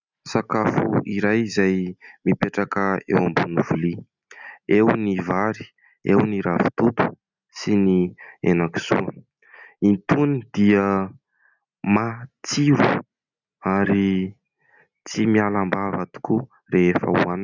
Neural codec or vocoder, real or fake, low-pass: none; real; 7.2 kHz